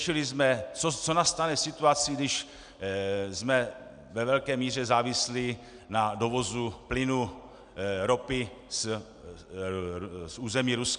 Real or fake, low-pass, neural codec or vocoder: real; 9.9 kHz; none